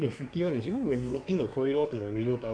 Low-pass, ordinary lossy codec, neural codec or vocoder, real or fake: 9.9 kHz; none; codec, 24 kHz, 1 kbps, SNAC; fake